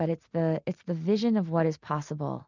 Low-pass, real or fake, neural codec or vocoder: 7.2 kHz; real; none